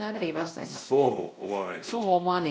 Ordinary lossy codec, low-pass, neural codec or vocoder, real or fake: none; none; codec, 16 kHz, 1 kbps, X-Codec, WavLM features, trained on Multilingual LibriSpeech; fake